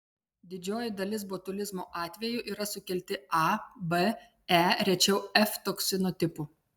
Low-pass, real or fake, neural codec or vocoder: 19.8 kHz; real; none